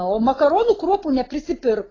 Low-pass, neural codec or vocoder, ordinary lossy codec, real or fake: 7.2 kHz; none; AAC, 32 kbps; real